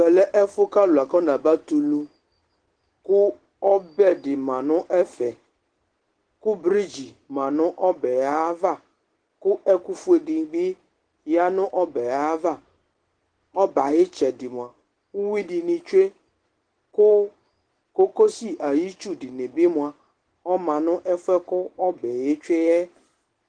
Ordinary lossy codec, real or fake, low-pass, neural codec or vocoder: Opus, 16 kbps; real; 9.9 kHz; none